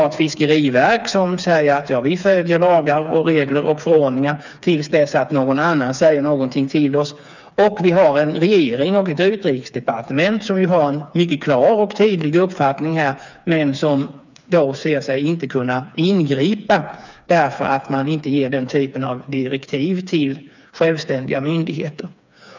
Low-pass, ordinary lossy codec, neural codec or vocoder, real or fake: 7.2 kHz; none; codec, 16 kHz, 4 kbps, FreqCodec, smaller model; fake